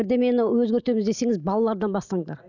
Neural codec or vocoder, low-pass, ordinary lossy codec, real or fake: none; 7.2 kHz; none; real